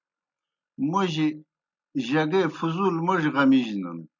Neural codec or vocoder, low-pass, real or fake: none; 7.2 kHz; real